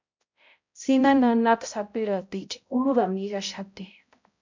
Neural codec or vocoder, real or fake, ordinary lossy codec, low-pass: codec, 16 kHz, 0.5 kbps, X-Codec, HuBERT features, trained on balanced general audio; fake; MP3, 64 kbps; 7.2 kHz